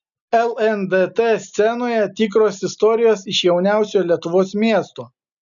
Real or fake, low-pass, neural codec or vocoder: real; 7.2 kHz; none